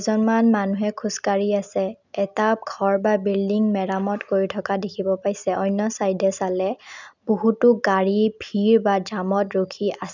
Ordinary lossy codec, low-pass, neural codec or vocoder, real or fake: none; 7.2 kHz; none; real